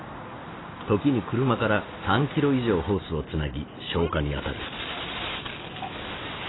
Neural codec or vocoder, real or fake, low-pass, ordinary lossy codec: none; real; 7.2 kHz; AAC, 16 kbps